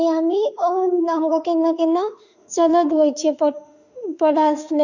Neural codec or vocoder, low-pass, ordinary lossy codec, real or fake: autoencoder, 48 kHz, 32 numbers a frame, DAC-VAE, trained on Japanese speech; 7.2 kHz; none; fake